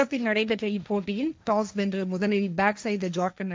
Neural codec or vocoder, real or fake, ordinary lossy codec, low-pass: codec, 16 kHz, 1.1 kbps, Voila-Tokenizer; fake; none; none